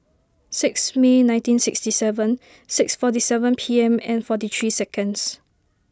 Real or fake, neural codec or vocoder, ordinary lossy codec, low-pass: real; none; none; none